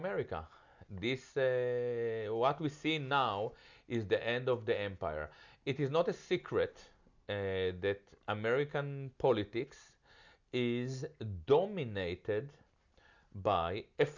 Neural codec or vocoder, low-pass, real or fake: none; 7.2 kHz; real